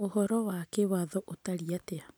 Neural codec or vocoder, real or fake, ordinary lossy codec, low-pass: none; real; none; none